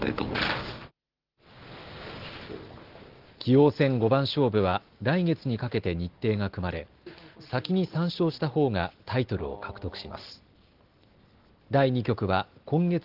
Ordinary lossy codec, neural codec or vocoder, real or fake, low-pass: Opus, 16 kbps; none; real; 5.4 kHz